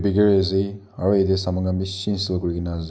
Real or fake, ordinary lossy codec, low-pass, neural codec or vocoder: real; none; none; none